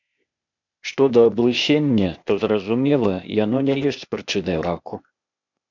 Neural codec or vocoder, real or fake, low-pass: codec, 16 kHz, 0.8 kbps, ZipCodec; fake; 7.2 kHz